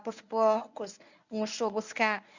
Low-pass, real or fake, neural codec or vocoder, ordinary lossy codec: 7.2 kHz; fake; codec, 24 kHz, 0.9 kbps, WavTokenizer, medium speech release version 1; none